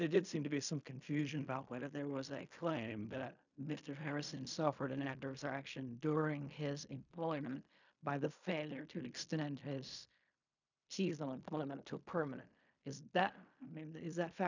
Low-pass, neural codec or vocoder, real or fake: 7.2 kHz; codec, 16 kHz in and 24 kHz out, 0.4 kbps, LongCat-Audio-Codec, fine tuned four codebook decoder; fake